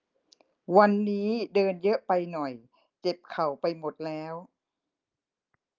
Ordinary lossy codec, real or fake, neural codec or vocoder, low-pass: Opus, 24 kbps; real; none; 7.2 kHz